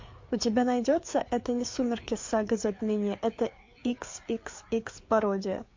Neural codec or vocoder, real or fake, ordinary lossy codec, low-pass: codec, 16 kHz, 4 kbps, FreqCodec, larger model; fake; MP3, 48 kbps; 7.2 kHz